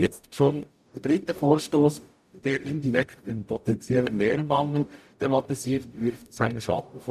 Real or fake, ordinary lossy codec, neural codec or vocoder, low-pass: fake; none; codec, 44.1 kHz, 0.9 kbps, DAC; 14.4 kHz